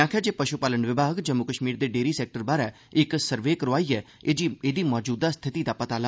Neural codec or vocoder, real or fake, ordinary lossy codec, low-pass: none; real; none; none